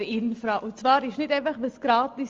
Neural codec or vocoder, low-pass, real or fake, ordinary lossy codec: none; 7.2 kHz; real; Opus, 32 kbps